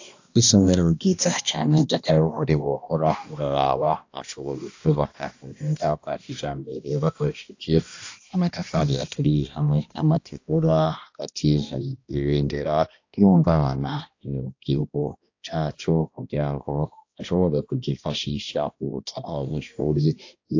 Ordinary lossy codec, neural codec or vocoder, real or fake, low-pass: AAC, 48 kbps; codec, 16 kHz, 1 kbps, X-Codec, HuBERT features, trained on balanced general audio; fake; 7.2 kHz